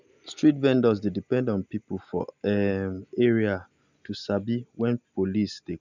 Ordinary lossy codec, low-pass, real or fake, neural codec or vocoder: none; 7.2 kHz; real; none